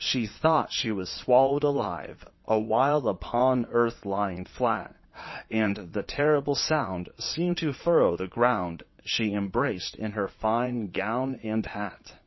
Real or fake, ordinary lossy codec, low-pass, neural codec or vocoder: fake; MP3, 24 kbps; 7.2 kHz; vocoder, 22.05 kHz, 80 mel bands, Vocos